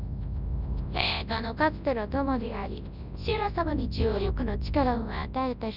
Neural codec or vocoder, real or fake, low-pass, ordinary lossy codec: codec, 24 kHz, 0.9 kbps, WavTokenizer, large speech release; fake; 5.4 kHz; none